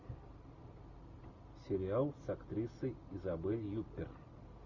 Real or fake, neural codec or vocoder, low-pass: real; none; 7.2 kHz